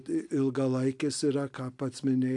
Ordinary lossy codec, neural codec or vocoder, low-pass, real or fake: AAC, 64 kbps; none; 10.8 kHz; real